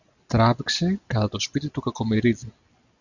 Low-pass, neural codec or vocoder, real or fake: 7.2 kHz; none; real